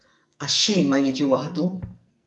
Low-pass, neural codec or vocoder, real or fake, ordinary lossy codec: 10.8 kHz; codec, 44.1 kHz, 2.6 kbps, SNAC; fake; MP3, 96 kbps